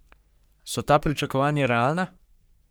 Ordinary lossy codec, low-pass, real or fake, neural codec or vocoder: none; none; fake; codec, 44.1 kHz, 3.4 kbps, Pupu-Codec